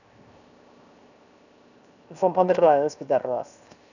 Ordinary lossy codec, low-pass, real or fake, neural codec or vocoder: none; 7.2 kHz; fake; codec, 16 kHz, 0.7 kbps, FocalCodec